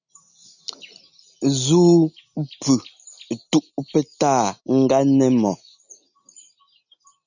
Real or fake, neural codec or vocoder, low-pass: real; none; 7.2 kHz